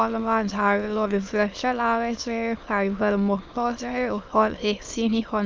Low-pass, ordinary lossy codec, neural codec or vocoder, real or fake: 7.2 kHz; Opus, 24 kbps; autoencoder, 22.05 kHz, a latent of 192 numbers a frame, VITS, trained on many speakers; fake